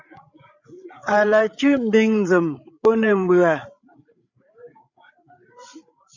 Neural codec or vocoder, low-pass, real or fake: codec, 16 kHz, 8 kbps, FreqCodec, larger model; 7.2 kHz; fake